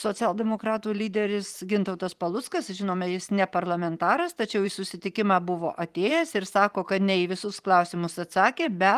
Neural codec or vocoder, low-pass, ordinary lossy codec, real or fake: vocoder, 44.1 kHz, 128 mel bands every 512 samples, BigVGAN v2; 14.4 kHz; Opus, 24 kbps; fake